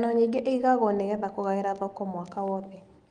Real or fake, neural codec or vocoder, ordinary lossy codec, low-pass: real; none; Opus, 24 kbps; 9.9 kHz